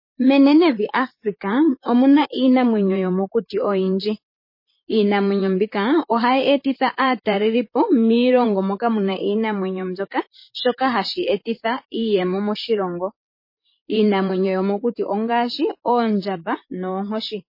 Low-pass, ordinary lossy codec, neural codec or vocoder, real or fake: 5.4 kHz; MP3, 24 kbps; vocoder, 44.1 kHz, 128 mel bands, Pupu-Vocoder; fake